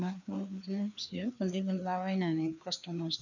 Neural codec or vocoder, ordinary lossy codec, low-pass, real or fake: codec, 16 kHz, 2 kbps, FreqCodec, larger model; none; 7.2 kHz; fake